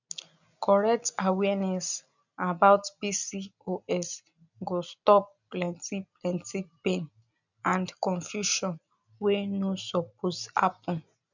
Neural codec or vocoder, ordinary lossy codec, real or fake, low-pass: none; none; real; 7.2 kHz